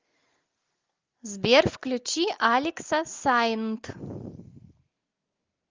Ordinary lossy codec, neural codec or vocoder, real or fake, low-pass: Opus, 24 kbps; none; real; 7.2 kHz